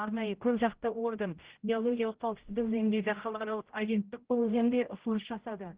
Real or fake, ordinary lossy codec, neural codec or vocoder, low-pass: fake; Opus, 16 kbps; codec, 16 kHz, 0.5 kbps, X-Codec, HuBERT features, trained on general audio; 3.6 kHz